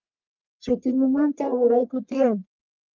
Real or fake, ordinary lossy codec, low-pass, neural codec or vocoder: fake; Opus, 24 kbps; 7.2 kHz; codec, 44.1 kHz, 1.7 kbps, Pupu-Codec